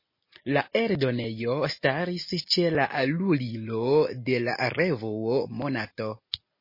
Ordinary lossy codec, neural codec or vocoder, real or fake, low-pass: MP3, 24 kbps; none; real; 5.4 kHz